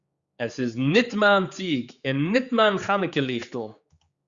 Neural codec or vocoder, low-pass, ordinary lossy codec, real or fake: codec, 16 kHz, 4 kbps, X-Codec, HuBERT features, trained on general audio; 7.2 kHz; Opus, 64 kbps; fake